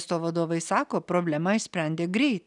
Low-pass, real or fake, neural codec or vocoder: 10.8 kHz; real; none